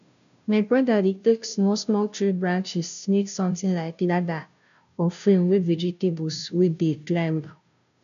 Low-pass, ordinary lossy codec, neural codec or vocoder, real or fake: 7.2 kHz; none; codec, 16 kHz, 0.5 kbps, FunCodec, trained on Chinese and English, 25 frames a second; fake